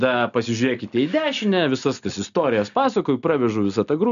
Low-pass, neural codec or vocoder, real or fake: 7.2 kHz; none; real